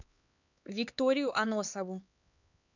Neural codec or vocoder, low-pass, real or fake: codec, 16 kHz, 4 kbps, X-Codec, HuBERT features, trained on LibriSpeech; 7.2 kHz; fake